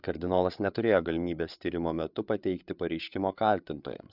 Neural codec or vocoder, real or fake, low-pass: codec, 16 kHz, 8 kbps, FreqCodec, larger model; fake; 5.4 kHz